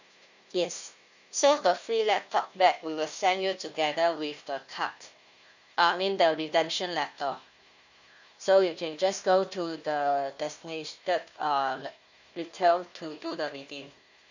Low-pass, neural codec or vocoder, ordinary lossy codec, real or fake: 7.2 kHz; codec, 16 kHz, 1 kbps, FunCodec, trained on Chinese and English, 50 frames a second; none; fake